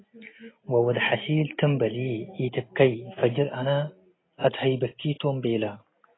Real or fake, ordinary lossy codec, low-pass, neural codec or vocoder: real; AAC, 16 kbps; 7.2 kHz; none